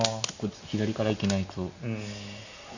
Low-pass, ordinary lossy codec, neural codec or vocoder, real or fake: 7.2 kHz; none; none; real